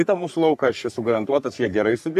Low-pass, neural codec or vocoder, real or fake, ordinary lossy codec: 14.4 kHz; codec, 44.1 kHz, 3.4 kbps, Pupu-Codec; fake; AAC, 96 kbps